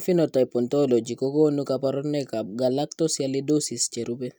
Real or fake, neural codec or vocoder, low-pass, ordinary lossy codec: real; none; none; none